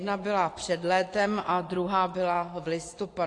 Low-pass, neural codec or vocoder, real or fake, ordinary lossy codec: 10.8 kHz; vocoder, 24 kHz, 100 mel bands, Vocos; fake; AAC, 48 kbps